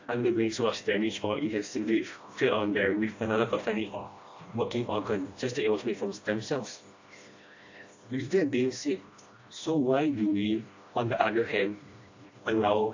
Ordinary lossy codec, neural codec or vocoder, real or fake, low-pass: AAC, 48 kbps; codec, 16 kHz, 1 kbps, FreqCodec, smaller model; fake; 7.2 kHz